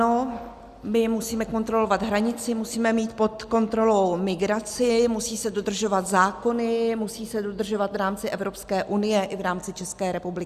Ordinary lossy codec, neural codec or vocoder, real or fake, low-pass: Opus, 64 kbps; none; real; 14.4 kHz